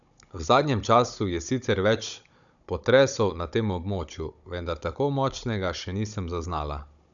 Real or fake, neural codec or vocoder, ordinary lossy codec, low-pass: fake; codec, 16 kHz, 16 kbps, FunCodec, trained on Chinese and English, 50 frames a second; none; 7.2 kHz